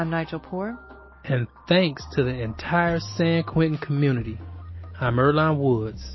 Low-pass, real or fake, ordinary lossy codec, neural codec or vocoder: 7.2 kHz; real; MP3, 24 kbps; none